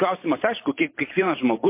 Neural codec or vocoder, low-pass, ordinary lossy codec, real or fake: vocoder, 44.1 kHz, 128 mel bands every 512 samples, BigVGAN v2; 3.6 kHz; MP3, 24 kbps; fake